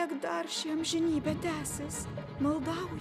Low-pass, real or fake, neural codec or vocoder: 14.4 kHz; real; none